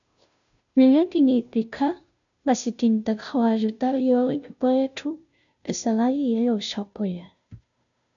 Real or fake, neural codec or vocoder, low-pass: fake; codec, 16 kHz, 0.5 kbps, FunCodec, trained on Chinese and English, 25 frames a second; 7.2 kHz